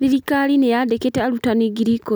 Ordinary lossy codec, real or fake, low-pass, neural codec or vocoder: none; real; none; none